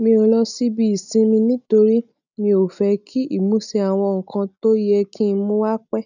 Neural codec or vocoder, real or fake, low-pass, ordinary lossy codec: none; real; 7.2 kHz; none